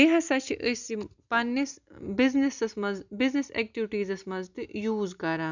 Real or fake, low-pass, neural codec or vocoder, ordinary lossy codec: real; 7.2 kHz; none; none